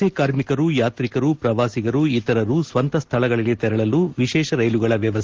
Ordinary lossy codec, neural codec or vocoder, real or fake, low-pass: Opus, 16 kbps; none; real; 7.2 kHz